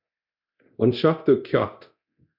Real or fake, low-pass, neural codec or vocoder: fake; 5.4 kHz; codec, 24 kHz, 0.9 kbps, DualCodec